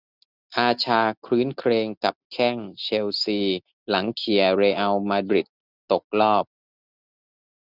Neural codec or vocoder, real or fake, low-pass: none; real; 5.4 kHz